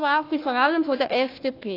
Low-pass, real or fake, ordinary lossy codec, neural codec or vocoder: 5.4 kHz; fake; AAC, 32 kbps; codec, 16 kHz, 1 kbps, FunCodec, trained on Chinese and English, 50 frames a second